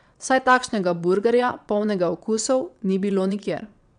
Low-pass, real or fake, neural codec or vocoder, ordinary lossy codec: 9.9 kHz; fake; vocoder, 22.05 kHz, 80 mel bands, WaveNeXt; none